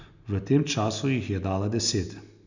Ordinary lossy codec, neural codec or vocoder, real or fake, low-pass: none; none; real; 7.2 kHz